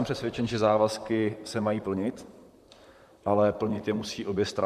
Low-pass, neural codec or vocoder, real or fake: 14.4 kHz; vocoder, 44.1 kHz, 128 mel bands, Pupu-Vocoder; fake